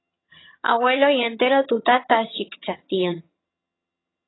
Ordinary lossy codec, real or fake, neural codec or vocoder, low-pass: AAC, 16 kbps; fake; vocoder, 22.05 kHz, 80 mel bands, HiFi-GAN; 7.2 kHz